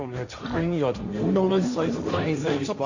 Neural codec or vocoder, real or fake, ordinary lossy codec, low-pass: codec, 16 kHz, 1.1 kbps, Voila-Tokenizer; fake; none; 7.2 kHz